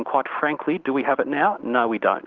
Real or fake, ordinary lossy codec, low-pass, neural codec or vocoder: real; Opus, 16 kbps; 7.2 kHz; none